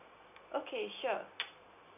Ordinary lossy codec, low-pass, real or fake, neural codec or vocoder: none; 3.6 kHz; real; none